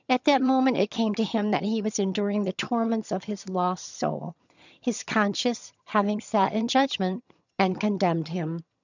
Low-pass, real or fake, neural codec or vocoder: 7.2 kHz; fake; vocoder, 22.05 kHz, 80 mel bands, HiFi-GAN